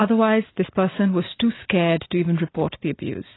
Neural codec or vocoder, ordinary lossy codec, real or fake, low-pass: none; AAC, 16 kbps; real; 7.2 kHz